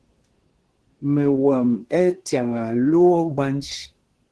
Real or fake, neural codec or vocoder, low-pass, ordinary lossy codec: fake; codec, 24 kHz, 1 kbps, SNAC; 10.8 kHz; Opus, 16 kbps